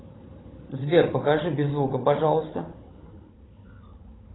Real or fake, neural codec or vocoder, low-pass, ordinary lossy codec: fake; codec, 16 kHz, 16 kbps, FunCodec, trained on Chinese and English, 50 frames a second; 7.2 kHz; AAC, 16 kbps